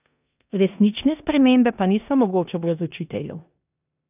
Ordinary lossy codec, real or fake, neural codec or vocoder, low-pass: none; fake; codec, 16 kHz in and 24 kHz out, 0.9 kbps, LongCat-Audio-Codec, four codebook decoder; 3.6 kHz